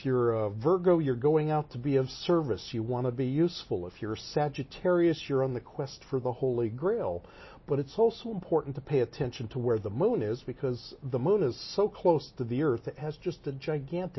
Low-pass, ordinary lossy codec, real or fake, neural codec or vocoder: 7.2 kHz; MP3, 24 kbps; real; none